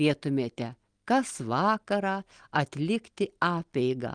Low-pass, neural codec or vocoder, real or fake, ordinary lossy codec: 9.9 kHz; none; real; Opus, 24 kbps